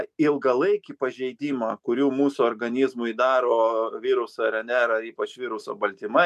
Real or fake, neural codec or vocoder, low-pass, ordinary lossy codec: real; none; 14.4 kHz; MP3, 96 kbps